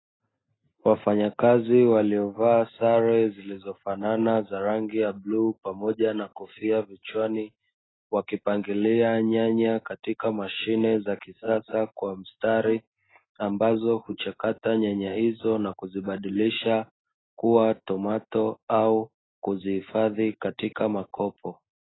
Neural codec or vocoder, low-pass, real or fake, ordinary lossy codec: none; 7.2 kHz; real; AAC, 16 kbps